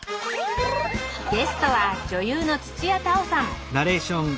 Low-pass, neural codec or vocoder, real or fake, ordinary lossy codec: none; none; real; none